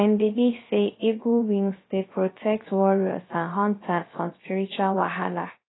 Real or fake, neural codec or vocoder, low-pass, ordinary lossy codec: fake; codec, 16 kHz, 0.3 kbps, FocalCodec; 7.2 kHz; AAC, 16 kbps